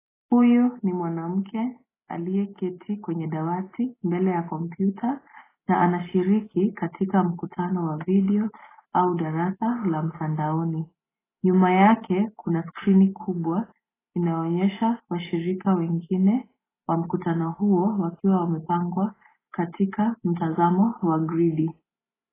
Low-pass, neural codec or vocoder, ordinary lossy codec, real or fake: 3.6 kHz; none; AAC, 16 kbps; real